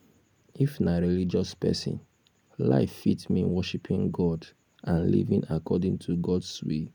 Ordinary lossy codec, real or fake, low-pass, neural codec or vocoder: none; real; 19.8 kHz; none